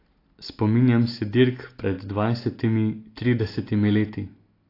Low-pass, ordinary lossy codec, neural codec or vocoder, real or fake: 5.4 kHz; AAC, 32 kbps; none; real